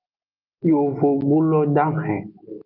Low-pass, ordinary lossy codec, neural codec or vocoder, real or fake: 5.4 kHz; Opus, 24 kbps; none; real